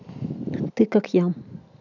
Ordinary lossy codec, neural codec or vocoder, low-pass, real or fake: none; none; 7.2 kHz; real